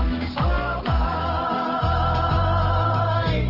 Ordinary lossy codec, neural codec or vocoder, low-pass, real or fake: Opus, 16 kbps; codec, 44.1 kHz, 7.8 kbps, DAC; 5.4 kHz; fake